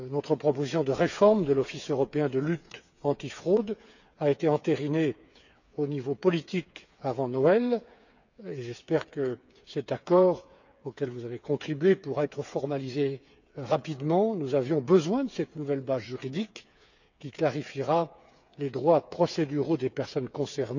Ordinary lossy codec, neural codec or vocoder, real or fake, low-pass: none; codec, 16 kHz, 8 kbps, FreqCodec, smaller model; fake; 7.2 kHz